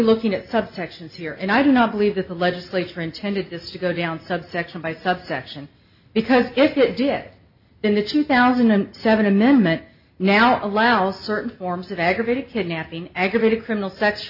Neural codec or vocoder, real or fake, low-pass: none; real; 5.4 kHz